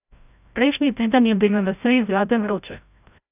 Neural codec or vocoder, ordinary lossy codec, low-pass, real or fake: codec, 16 kHz, 0.5 kbps, FreqCodec, larger model; none; 3.6 kHz; fake